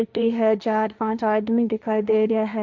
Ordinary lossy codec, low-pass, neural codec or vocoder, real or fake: none; none; codec, 16 kHz, 1.1 kbps, Voila-Tokenizer; fake